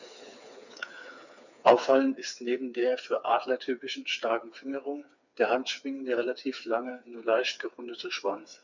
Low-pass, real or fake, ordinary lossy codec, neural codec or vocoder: 7.2 kHz; fake; none; codec, 16 kHz, 4 kbps, FreqCodec, smaller model